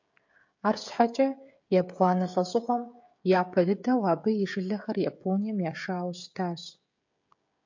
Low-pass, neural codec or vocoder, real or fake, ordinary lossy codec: 7.2 kHz; codec, 16 kHz, 16 kbps, FreqCodec, smaller model; fake; AAC, 48 kbps